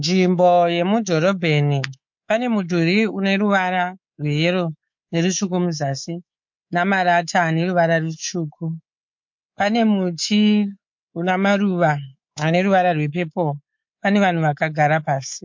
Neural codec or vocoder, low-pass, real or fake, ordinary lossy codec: codec, 24 kHz, 3.1 kbps, DualCodec; 7.2 kHz; fake; MP3, 48 kbps